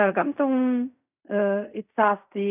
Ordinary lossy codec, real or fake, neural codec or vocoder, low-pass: none; fake; codec, 16 kHz in and 24 kHz out, 0.4 kbps, LongCat-Audio-Codec, fine tuned four codebook decoder; 3.6 kHz